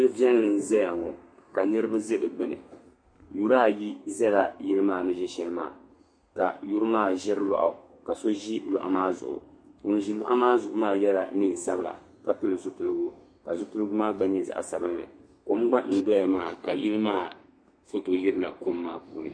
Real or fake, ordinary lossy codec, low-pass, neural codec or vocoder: fake; MP3, 48 kbps; 9.9 kHz; codec, 32 kHz, 1.9 kbps, SNAC